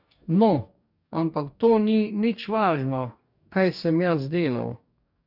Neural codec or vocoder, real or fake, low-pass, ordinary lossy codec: codec, 44.1 kHz, 2.6 kbps, DAC; fake; 5.4 kHz; none